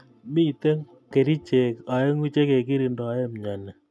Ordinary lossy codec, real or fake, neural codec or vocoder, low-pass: none; real; none; none